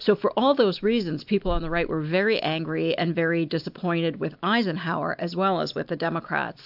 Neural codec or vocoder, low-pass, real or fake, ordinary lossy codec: none; 5.4 kHz; real; AAC, 48 kbps